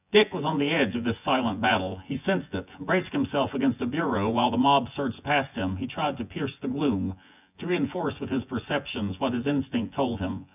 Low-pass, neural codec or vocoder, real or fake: 3.6 kHz; vocoder, 24 kHz, 100 mel bands, Vocos; fake